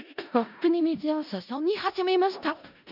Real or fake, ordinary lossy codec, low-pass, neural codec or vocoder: fake; none; 5.4 kHz; codec, 16 kHz in and 24 kHz out, 0.4 kbps, LongCat-Audio-Codec, four codebook decoder